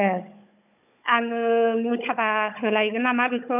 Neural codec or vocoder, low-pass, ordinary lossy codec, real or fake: codec, 16 kHz, 16 kbps, FunCodec, trained on Chinese and English, 50 frames a second; 3.6 kHz; none; fake